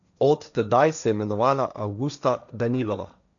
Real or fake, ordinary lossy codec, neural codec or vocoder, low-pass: fake; none; codec, 16 kHz, 1.1 kbps, Voila-Tokenizer; 7.2 kHz